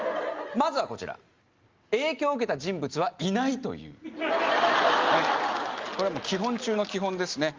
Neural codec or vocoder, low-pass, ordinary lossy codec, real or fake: none; 7.2 kHz; Opus, 32 kbps; real